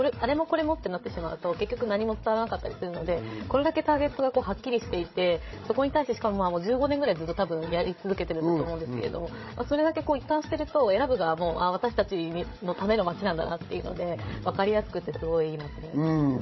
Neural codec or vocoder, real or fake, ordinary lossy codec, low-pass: codec, 16 kHz, 16 kbps, FreqCodec, larger model; fake; MP3, 24 kbps; 7.2 kHz